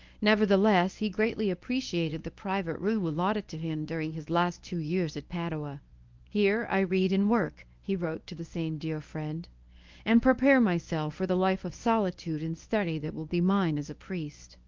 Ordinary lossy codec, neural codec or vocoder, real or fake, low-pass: Opus, 24 kbps; codec, 24 kHz, 0.9 kbps, WavTokenizer, medium speech release version 1; fake; 7.2 kHz